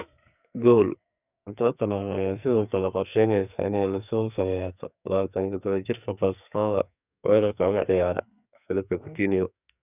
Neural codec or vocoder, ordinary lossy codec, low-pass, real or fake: codec, 44.1 kHz, 2.6 kbps, SNAC; none; 3.6 kHz; fake